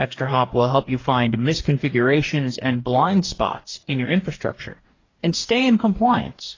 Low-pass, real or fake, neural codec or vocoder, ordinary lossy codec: 7.2 kHz; fake; codec, 44.1 kHz, 2.6 kbps, DAC; AAC, 32 kbps